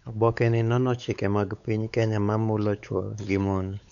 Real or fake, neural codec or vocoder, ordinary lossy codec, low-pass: fake; codec, 16 kHz, 4 kbps, X-Codec, WavLM features, trained on Multilingual LibriSpeech; none; 7.2 kHz